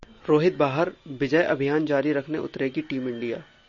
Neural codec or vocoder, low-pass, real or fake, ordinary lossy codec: none; 7.2 kHz; real; MP3, 32 kbps